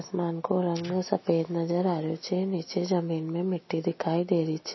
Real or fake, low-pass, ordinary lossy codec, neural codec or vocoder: real; 7.2 kHz; MP3, 24 kbps; none